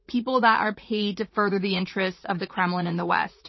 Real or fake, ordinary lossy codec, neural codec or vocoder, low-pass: fake; MP3, 24 kbps; vocoder, 44.1 kHz, 80 mel bands, Vocos; 7.2 kHz